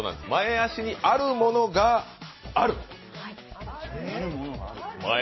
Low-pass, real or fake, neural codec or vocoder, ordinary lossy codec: 7.2 kHz; real; none; MP3, 24 kbps